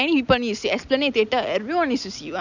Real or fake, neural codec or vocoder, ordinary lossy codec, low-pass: real; none; none; 7.2 kHz